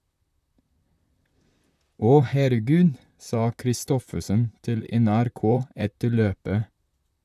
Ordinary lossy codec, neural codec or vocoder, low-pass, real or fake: none; vocoder, 44.1 kHz, 128 mel bands, Pupu-Vocoder; 14.4 kHz; fake